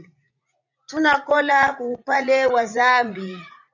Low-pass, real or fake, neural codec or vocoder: 7.2 kHz; fake; vocoder, 44.1 kHz, 80 mel bands, Vocos